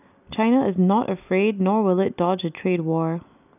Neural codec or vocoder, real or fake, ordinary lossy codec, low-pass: none; real; none; 3.6 kHz